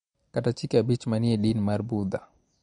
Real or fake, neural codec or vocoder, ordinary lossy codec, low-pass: real; none; MP3, 48 kbps; 9.9 kHz